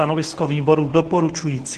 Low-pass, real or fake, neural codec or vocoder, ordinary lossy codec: 10.8 kHz; fake; codec, 24 kHz, 0.9 kbps, WavTokenizer, medium speech release version 1; Opus, 16 kbps